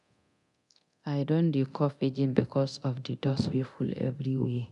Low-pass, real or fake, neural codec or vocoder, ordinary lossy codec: 10.8 kHz; fake; codec, 24 kHz, 0.9 kbps, DualCodec; none